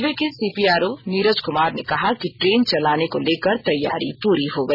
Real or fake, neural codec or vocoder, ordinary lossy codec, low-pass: real; none; none; 5.4 kHz